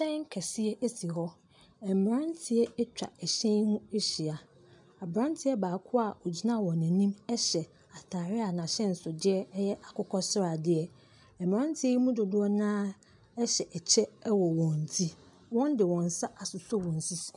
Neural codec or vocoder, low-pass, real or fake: none; 10.8 kHz; real